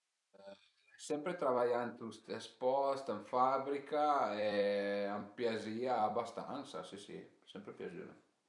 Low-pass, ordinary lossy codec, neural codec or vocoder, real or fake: 10.8 kHz; none; vocoder, 44.1 kHz, 128 mel bands every 256 samples, BigVGAN v2; fake